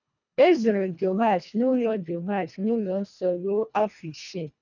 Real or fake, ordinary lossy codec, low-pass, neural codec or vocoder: fake; none; 7.2 kHz; codec, 24 kHz, 1.5 kbps, HILCodec